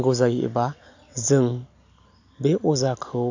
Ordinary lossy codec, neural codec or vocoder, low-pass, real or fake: AAC, 48 kbps; none; 7.2 kHz; real